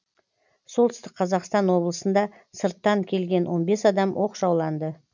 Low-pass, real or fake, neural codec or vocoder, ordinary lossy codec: 7.2 kHz; real; none; none